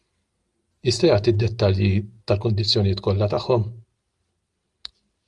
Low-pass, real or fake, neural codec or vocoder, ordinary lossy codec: 10.8 kHz; real; none; Opus, 32 kbps